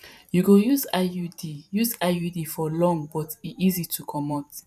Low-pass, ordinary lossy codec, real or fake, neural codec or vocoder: 14.4 kHz; none; fake; vocoder, 44.1 kHz, 128 mel bands every 512 samples, BigVGAN v2